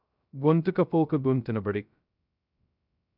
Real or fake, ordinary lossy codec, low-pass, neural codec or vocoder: fake; none; 5.4 kHz; codec, 16 kHz, 0.2 kbps, FocalCodec